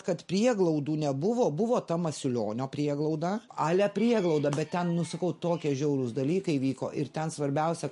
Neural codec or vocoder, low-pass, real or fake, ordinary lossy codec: none; 14.4 kHz; real; MP3, 48 kbps